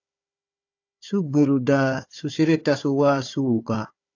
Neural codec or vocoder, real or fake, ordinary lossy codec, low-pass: codec, 16 kHz, 4 kbps, FunCodec, trained on Chinese and English, 50 frames a second; fake; AAC, 48 kbps; 7.2 kHz